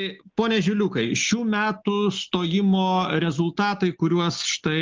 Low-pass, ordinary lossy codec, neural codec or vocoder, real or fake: 7.2 kHz; Opus, 32 kbps; none; real